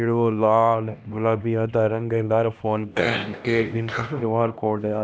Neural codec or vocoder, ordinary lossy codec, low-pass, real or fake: codec, 16 kHz, 1 kbps, X-Codec, HuBERT features, trained on LibriSpeech; none; none; fake